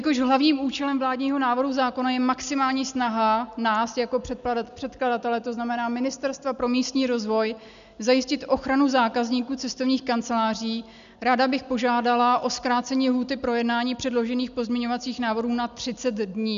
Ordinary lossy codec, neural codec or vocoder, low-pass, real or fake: MP3, 96 kbps; none; 7.2 kHz; real